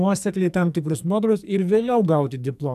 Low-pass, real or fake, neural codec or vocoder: 14.4 kHz; fake; codec, 44.1 kHz, 2.6 kbps, SNAC